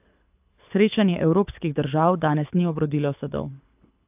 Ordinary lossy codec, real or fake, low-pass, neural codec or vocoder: AAC, 32 kbps; fake; 3.6 kHz; codec, 24 kHz, 6 kbps, HILCodec